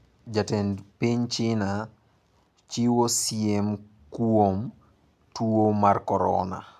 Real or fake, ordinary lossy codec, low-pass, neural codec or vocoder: real; none; 14.4 kHz; none